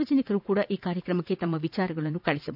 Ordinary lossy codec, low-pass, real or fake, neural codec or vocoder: AAC, 48 kbps; 5.4 kHz; fake; vocoder, 44.1 kHz, 80 mel bands, Vocos